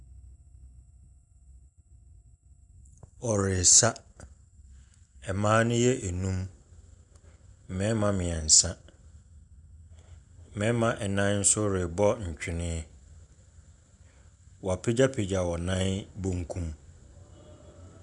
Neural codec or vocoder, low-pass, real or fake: none; 10.8 kHz; real